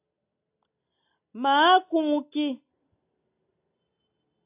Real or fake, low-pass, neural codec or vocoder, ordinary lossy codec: real; 3.6 kHz; none; AAC, 32 kbps